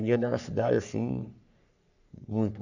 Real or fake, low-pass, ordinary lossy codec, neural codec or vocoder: fake; 7.2 kHz; none; codec, 44.1 kHz, 3.4 kbps, Pupu-Codec